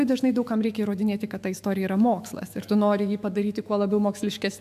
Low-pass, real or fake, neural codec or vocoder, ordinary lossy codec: 14.4 kHz; fake; autoencoder, 48 kHz, 128 numbers a frame, DAC-VAE, trained on Japanese speech; AAC, 96 kbps